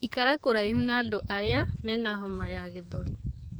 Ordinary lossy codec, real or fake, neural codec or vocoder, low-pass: none; fake; codec, 44.1 kHz, 2.6 kbps, SNAC; none